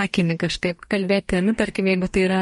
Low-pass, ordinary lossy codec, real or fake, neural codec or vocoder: 19.8 kHz; MP3, 48 kbps; fake; codec, 44.1 kHz, 2.6 kbps, DAC